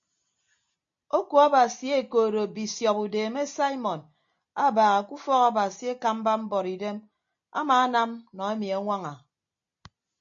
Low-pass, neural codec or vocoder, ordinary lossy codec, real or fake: 7.2 kHz; none; MP3, 48 kbps; real